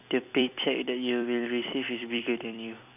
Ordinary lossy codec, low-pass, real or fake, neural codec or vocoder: none; 3.6 kHz; fake; vocoder, 44.1 kHz, 128 mel bands every 256 samples, BigVGAN v2